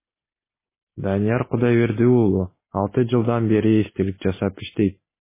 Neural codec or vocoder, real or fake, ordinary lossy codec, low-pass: none; real; MP3, 16 kbps; 3.6 kHz